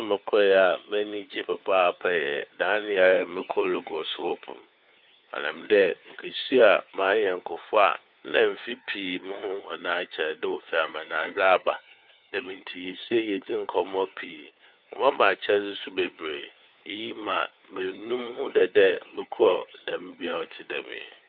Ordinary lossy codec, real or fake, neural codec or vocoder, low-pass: none; fake; codec, 16 kHz, 4 kbps, FunCodec, trained on LibriTTS, 50 frames a second; 5.4 kHz